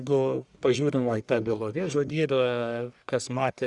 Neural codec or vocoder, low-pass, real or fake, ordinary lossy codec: codec, 44.1 kHz, 1.7 kbps, Pupu-Codec; 10.8 kHz; fake; Opus, 64 kbps